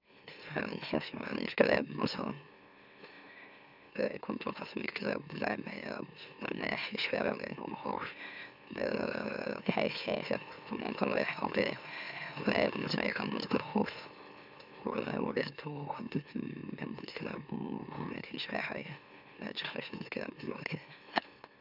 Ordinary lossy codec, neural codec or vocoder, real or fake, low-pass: none; autoencoder, 44.1 kHz, a latent of 192 numbers a frame, MeloTTS; fake; 5.4 kHz